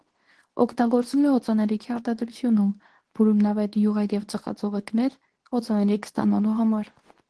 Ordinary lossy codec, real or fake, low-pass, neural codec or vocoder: Opus, 16 kbps; fake; 10.8 kHz; codec, 24 kHz, 0.9 kbps, WavTokenizer, medium speech release version 2